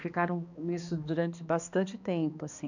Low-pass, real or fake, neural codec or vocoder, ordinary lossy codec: 7.2 kHz; fake; codec, 16 kHz, 2 kbps, X-Codec, HuBERT features, trained on balanced general audio; none